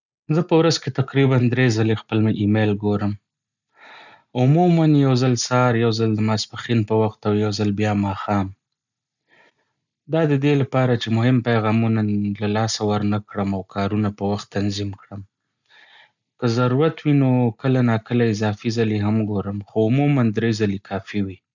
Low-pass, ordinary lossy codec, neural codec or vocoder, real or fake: 7.2 kHz; none; none; real